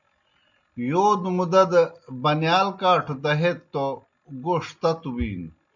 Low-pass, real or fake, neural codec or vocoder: 7.2 kHz; real; none